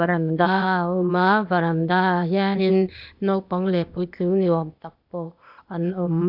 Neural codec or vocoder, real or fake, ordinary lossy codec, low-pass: codec, 16 kHz, 0.8 kbps, ZipCodec; fake; none; 5.4 kHz